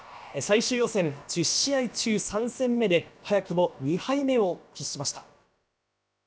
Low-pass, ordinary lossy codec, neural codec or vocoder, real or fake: none; none; codec, 16 kHz, about 1 kbps, DyCAST, with the encoder's durations; fake